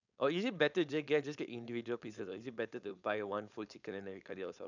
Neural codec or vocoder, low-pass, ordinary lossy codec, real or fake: codec, 16 kHz, 4.8 kbps, FACodec; 7.2 kHz; none; fake